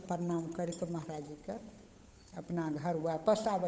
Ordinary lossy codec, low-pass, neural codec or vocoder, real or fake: none; none; codec, 16 kHz, 8 kbps, FunCodec, trained on Chinese and English, 25 frames a second; fake